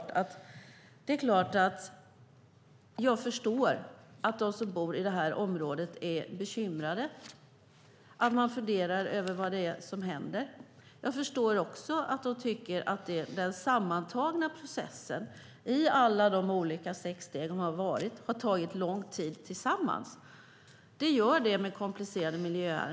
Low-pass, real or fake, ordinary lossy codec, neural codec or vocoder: none; real; none; none